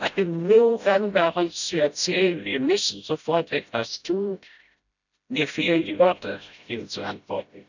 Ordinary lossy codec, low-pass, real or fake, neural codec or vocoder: none; 7.2 kHz; fake; codec, 16 kHz, 0.5 kbps, FreqCodec, smaller model